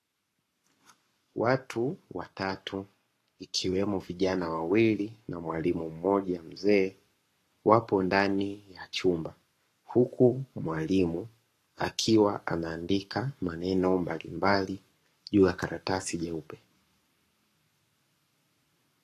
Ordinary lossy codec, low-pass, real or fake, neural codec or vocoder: AAC, 48 kbps; 14.4 kHz; fake; codec, 44.1 kHz, 7.8 kbps, Pupu-Codec